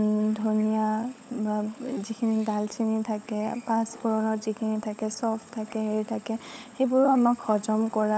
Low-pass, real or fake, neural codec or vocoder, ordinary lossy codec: none; fake; codec, 16 kHz, 16 kbps, FunCodec, trained on LibriTTS, 50 frames a second; none